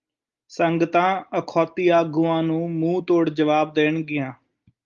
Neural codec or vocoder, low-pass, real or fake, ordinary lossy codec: none; 7.2 kHz; real; Opus, 32 kbps